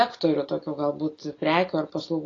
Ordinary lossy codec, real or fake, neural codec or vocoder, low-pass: AAC, 32 kbps; real; none; 7.2 kHz